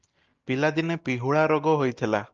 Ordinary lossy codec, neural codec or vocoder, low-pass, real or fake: Opus, 24 kbps; none; 7.2 kHz; real